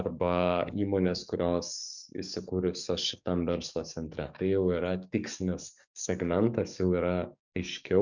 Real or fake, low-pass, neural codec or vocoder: fake; 7.2 kHz; codec, 44.1 kHz, 7.8 kbps, DAC